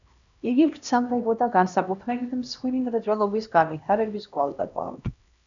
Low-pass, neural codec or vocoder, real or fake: 7.2 kHz; codec, 16 kHz, 1 kbps, X-Codec, HuBERT features, trained on LibriSpeech; fake